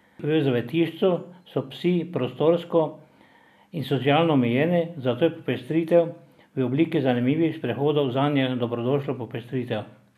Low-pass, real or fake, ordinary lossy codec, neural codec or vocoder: 14.4 kHz; real; none; none